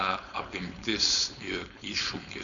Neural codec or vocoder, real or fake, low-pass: codec, 16 kHz, 4.8 kbps, FACodec; fake; 7.2 kHz